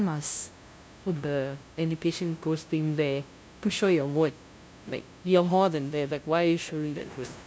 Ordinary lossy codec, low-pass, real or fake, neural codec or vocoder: none; none; fake; codec, 16 kHz, 0.5 kbps, FunCodec, trained on LibriTTS, 25 frames a second